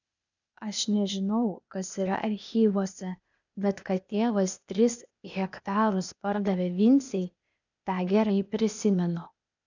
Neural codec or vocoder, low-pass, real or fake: codec, 16 kHz, 0.8 kbps, ZipCodec; 7.2 kHz; fake